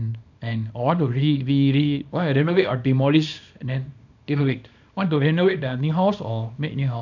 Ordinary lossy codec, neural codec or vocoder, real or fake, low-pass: none; codec, 24 kHz, 0.9 kbps, WavTokenizer, small release; fake; 7.2 kHz